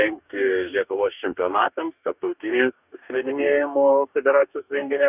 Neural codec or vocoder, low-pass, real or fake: codec, 44.1 kHz, 2.6 kbps, DAC; 3.6 kHz; fake